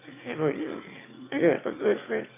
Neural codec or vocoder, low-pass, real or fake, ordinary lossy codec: autoencoder, 22.05 kHz, a latent of 192 numbers a frame, VITS, trained on one speaker; 3.6 kHz; fake; AAC, 24 kbps